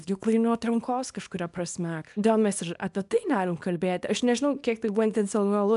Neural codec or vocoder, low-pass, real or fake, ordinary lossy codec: codec, 24 kHz, 0.9 kbps, WavTokenizer, small release; 10.8 kHz; fake; MP3, 96 kbps